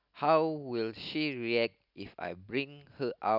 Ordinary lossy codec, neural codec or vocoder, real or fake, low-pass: none; none; real; 5.4 kHz